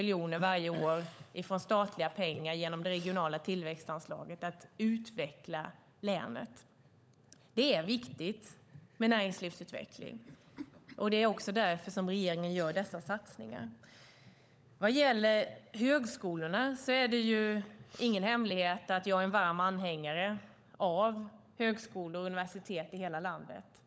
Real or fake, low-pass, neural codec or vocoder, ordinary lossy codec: fake; none; codec, 16 kHz, 16 kbps, FunCodec, trained on LibriTTS, 50 frames a second; none